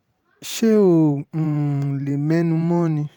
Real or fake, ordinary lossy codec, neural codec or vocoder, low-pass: fake; none; vocoder, 44.1 kHz, 128 mel bands every 512 samples, BigVGAN v2; 19.8 kHz